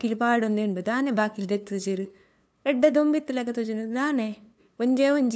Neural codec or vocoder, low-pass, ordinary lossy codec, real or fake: codec, 16 kHz, 2 kbps, FunCodec, trained on LibriTTS, 25 frames a second; none; none; fake